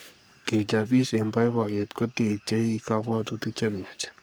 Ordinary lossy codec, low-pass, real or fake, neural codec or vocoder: none; none; fake; codec, 44.1 kHz, 3.4 kbps, Pupu-Codec